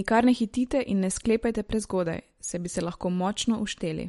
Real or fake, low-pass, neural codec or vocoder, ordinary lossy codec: real; 19.8 kHz; none; MP3, 48 kbps